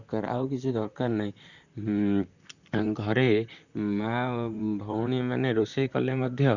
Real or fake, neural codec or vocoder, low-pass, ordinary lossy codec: fake; vocoder, 44.1 kHz, 128 mel bands, Pupu-Vocoder; 7.2 kHz; none